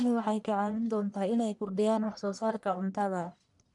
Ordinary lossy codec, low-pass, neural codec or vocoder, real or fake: none; 10.8 kHz; codec, 44.1 kHz, 1.7 kbps, Pupu-Codec; fake